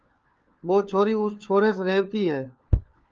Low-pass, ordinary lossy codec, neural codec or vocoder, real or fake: 7.2 kHz; Opus, 24 kbps; codec, 16 kHz, 4 kbps, FunCodec, trained on LibriTTS, 50 frames a second; fake